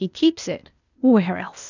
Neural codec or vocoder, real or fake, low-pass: codec, 16 kHz, 0.8 kbps, ZipCodec; fake; 7.2 kHz